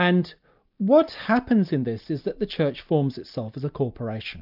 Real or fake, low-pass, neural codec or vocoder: real; 5.4 kHz; none